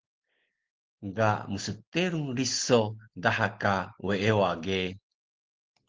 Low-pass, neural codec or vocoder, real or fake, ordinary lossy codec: 7.2 kHz; none; real; Opus, 16 kbps